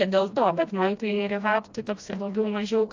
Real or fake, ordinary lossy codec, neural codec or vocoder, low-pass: fake; AAC, 48 kbps; codec, 16 kHz, 1 kbps, FreqCodec, smaller model; 7.2 kHz